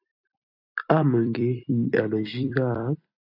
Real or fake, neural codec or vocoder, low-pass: fake; vocoder, 44.1 kHz, 128 mel bands every 256 samples, BigVGAN v2; 5.4 kHz